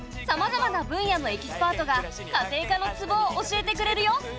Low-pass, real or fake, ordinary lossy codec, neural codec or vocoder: none; real; none; none